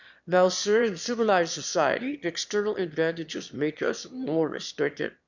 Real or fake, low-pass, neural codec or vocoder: fake; 7.2 kHz; autoencoder, 22.05 kHz, a latent of 192 numbers a frame, VITS, trained on one speaker